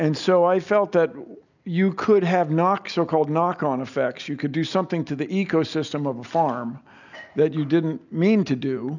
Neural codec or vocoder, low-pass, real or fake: none; 7.2 kHz; real